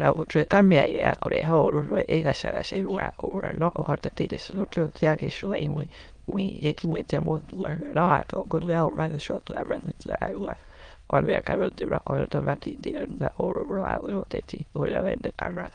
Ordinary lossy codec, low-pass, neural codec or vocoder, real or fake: Opus, 32 kbps; 9.9 kHz; autoencoder, 22.05 kHz, a latent of 192 numbers a frame, VITS, trained on many speakers; fake